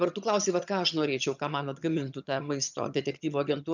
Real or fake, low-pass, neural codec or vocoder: fake; 7.2 kHz; vocoder, 22.05 kHz, 80 mel bands, HiFi-GAN